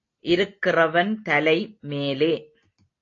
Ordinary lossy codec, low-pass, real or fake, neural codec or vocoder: AAC, 32 kbps; 7.2 kHz; real; none